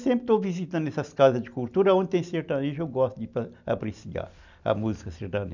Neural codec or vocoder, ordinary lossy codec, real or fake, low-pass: none; none; real; 7.2 kHz